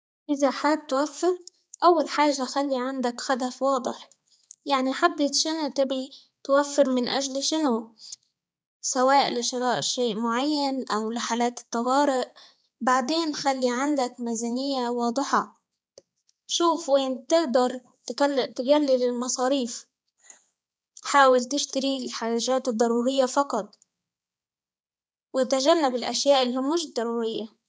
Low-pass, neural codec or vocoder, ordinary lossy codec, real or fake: none; codec, 16 kHz, 4 kbps, X-Codec, HuBERT features, trained on balanced general audio; none; fake